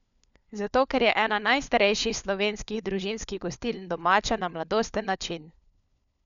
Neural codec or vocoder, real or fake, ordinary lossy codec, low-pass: codec, 16 kHz, 4 kbps, FunCodec, trained on LibriTTS, 50 frames a second; fake; none; 7.2 kHz